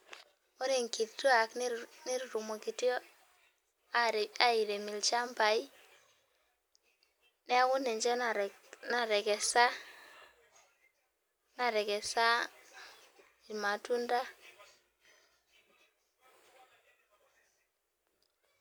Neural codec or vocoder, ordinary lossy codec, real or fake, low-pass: none; none; real; none